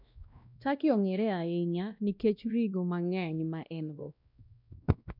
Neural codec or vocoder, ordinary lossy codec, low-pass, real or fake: codec, 16 kHz, 1 kbps, X-Codec, WavLM features, trained on Multilingual LibriSpeech; none; 5.4 kHz; fake